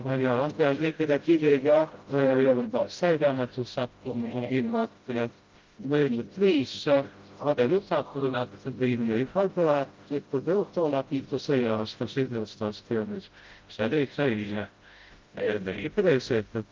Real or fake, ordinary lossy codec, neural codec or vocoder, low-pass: fake; Opus, 32 kbps; codec, 16 kHz, 0.5 kbps, FreqCodec, smaller model; 7.2 kHz